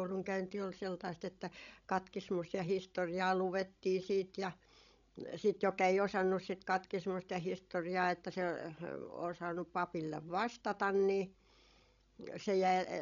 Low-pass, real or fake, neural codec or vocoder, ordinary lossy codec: 7.2 kHz; fake; codec, 16 kHz, 16 kbps, FreqCodec, larger model; none